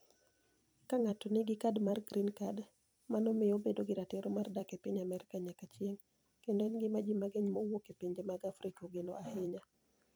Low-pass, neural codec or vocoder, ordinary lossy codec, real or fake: none; vocoder, 44.1 kHz, 128 mel bands every 256 samples, BigVGAN v2; none; fake